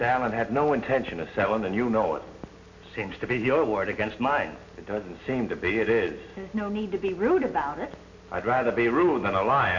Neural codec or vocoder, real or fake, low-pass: none; real; 7.2 kHz